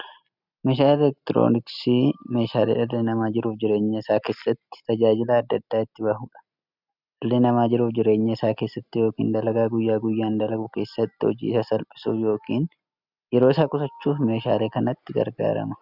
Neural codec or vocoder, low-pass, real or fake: none; 5.4 kHz; real